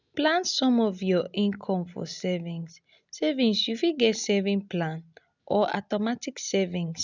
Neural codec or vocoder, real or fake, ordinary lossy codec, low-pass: none; real; none; 7.2 kHz